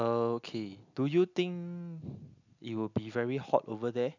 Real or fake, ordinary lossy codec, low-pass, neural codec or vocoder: real; none; 7.2 kHz; none